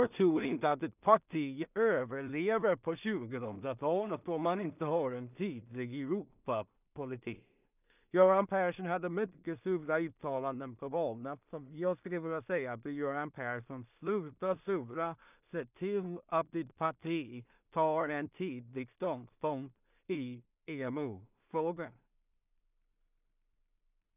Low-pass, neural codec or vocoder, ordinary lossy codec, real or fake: 3.6 kHz; codec, 16 kHz in and 24 kHz out, 0.4 kbps, LongCat-Audio-Codec, two codebook decoder; none; fake